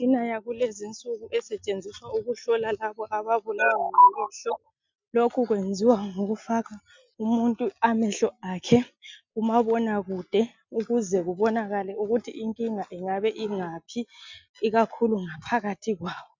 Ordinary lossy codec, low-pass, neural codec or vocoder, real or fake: MP3, 64 kbps; 7.2 kHz; none; real